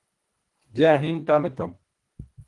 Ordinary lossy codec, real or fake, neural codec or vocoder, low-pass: Opus, 24 kbps; fake; codec, 24 kHz, 1.5 kbps, HILCodec; 10.8 kHz